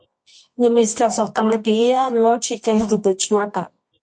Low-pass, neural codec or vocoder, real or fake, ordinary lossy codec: 9.9 kHz; codec, 24 kHz, 0.9 kbps, WavTokenizer, medium music audio release; fake; MP3, 48 kbps